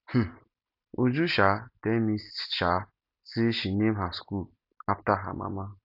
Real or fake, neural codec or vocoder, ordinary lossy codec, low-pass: real; none; none; 5.4 kHz